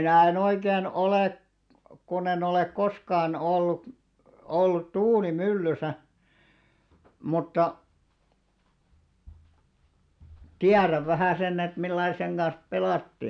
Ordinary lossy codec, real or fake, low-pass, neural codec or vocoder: none; real; 9.9 kHz; none